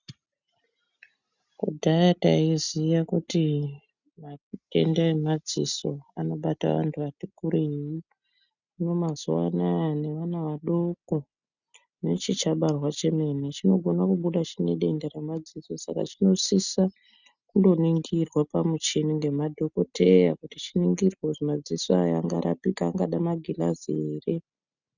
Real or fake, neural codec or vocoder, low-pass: real; none; 7.2 kHz